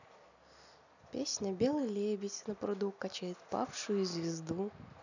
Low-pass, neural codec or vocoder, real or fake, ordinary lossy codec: 7.2 kHz; none; real; none